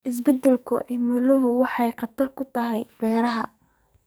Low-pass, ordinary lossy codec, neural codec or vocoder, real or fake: none; none; codec, 44.1 kHz, 2.6 kbps, SNAC; fake